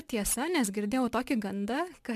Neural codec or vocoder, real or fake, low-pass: none; real; 14.4 kHz